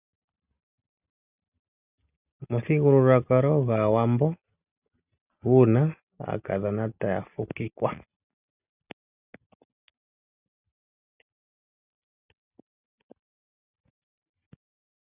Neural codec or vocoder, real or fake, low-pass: none; real; 3.6 kHz